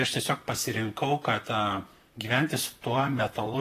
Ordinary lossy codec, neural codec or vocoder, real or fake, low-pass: AAC, 48 kbps; vocoder, 44.1 kHz, 128 mel bands, Pupu-Vocoder; fake; 14.4 kHz